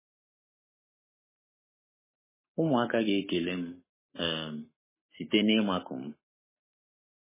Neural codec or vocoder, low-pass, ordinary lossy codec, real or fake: codec, 44.1 kHz, 7.8 kbps, Pupu-Codec; 3.6 kHz; MP3, 16 kbps; fake